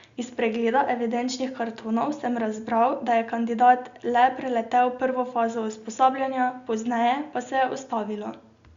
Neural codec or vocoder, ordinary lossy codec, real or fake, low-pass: none; Opus, 64 kbps; real; 7.2 kHz